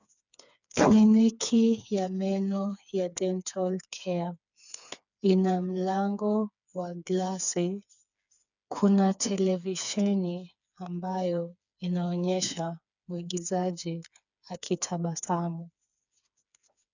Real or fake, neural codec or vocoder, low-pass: fake; codec, 16 kHz, 4 kbps, FreqCodec, smaller model; 7.2 kHz